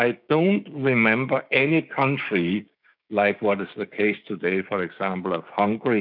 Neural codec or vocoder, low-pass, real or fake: codec, 16 kHz, 6 kbps, DAC; 5.4 kHz; fake